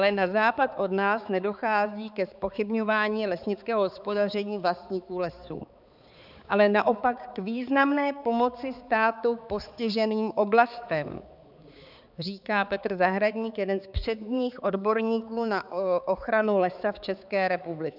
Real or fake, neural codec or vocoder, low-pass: fake; codec, 16 kHz, 4 kbps, X-Codec, HuBERT features, trained on balanced general audio; 5.4 kHz